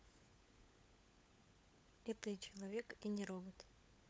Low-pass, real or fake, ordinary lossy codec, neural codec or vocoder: none; fake; none; codec, 16 kHz, 16 kbps, FunCodec, trained on LibriTTS, 50 frames a second